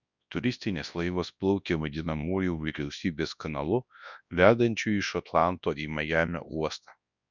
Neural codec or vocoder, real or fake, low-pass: codec, 24 kHz, 0.9 kbps, WavTokenizer, large speech release; fake; 7.2 kHz